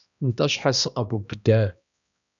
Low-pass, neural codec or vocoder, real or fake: 7.2 kHz; codec, 16 kHz, 1 kbps, X-Codec, HuBERT features, trained on balanced general audio; fake